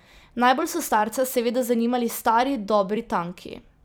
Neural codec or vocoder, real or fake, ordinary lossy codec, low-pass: vocoder, 44.1 kHz, 128 mel bands every 256 samples, BigVGAN v2; fake; none; none